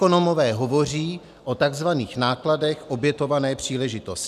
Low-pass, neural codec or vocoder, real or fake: 14.4 kHz; none; real